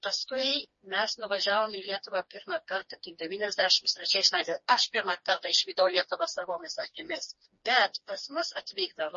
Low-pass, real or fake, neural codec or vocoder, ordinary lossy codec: 7.2 kHz; fake; codec, 16 kHz, 2 kbps, FreqCodec, smaller model; MP3, 32 kbps